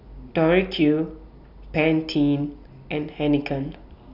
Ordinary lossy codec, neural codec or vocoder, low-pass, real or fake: none; none; 5.4 kHz; real